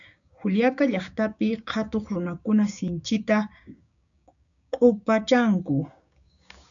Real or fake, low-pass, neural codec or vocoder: fake; 7.2 kHz; codec, 16 kHz, 6 kbps, DAC